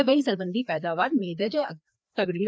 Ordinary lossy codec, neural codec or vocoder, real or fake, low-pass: none; codec, 16 kHz, 2 kbps, FreqCodec, larger model; fake; none